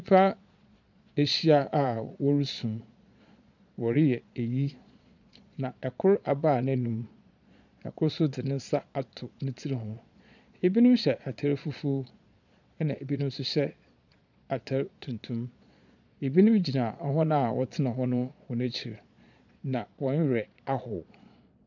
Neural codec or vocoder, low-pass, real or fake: vocoder, 22.05 kHz, 80 mel bands, Vocos; 7.2 kHz; fake